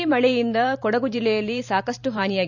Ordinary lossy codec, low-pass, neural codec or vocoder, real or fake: none; 7.2 kHz; vocoder, 44.1 kHz, 128 mel bands every 512 samples, BigVGAN v2; fake